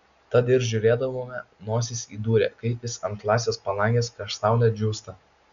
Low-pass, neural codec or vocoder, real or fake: 7.2 kHz; none; real